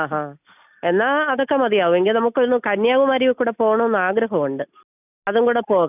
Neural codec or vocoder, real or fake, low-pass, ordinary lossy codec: none; real; 3.6 kHz; none